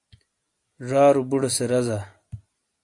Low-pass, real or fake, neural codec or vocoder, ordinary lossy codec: 10.8 kHz; real; none; AAC, 64 kbps